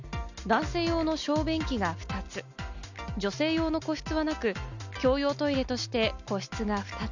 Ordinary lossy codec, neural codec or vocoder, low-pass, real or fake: none; none; 7.2 kHz; real